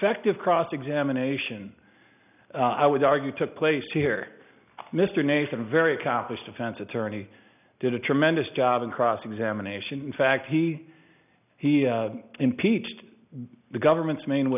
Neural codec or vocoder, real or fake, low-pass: none; real; 3.6 kHz